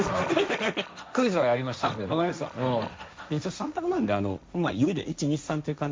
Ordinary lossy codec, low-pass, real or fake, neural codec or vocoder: none; none; fake; codec, 16 kHz, 1.1 kbps, Voila-Tokenizer